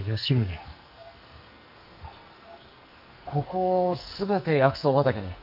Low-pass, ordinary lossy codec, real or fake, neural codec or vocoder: 5.4 kHz; none; fake; codec, 44.1 kHz, 2.6 kbps, SNAC